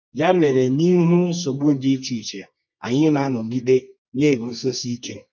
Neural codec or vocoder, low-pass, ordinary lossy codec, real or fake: codec, 24 kHz, 0.9 kbps, WavTokenizer, medium music audio release; 7.2 kHz; none; fake